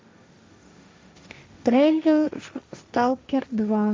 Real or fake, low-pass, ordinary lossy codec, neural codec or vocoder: fake; none; none; codec, 16 kHz, 1.1 kbps, Voila-Tokenizer